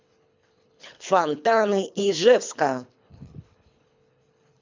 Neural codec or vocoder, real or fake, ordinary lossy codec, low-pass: codec, 24 kHz, 3 kbps, HILCodec; fake; MP3, 64 kbps; 7.2 kHz